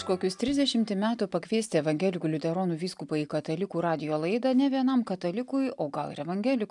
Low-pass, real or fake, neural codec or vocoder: 10.8 kHz; real; none